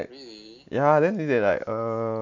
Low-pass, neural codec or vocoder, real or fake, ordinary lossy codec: 7.2 kHz; none; real; none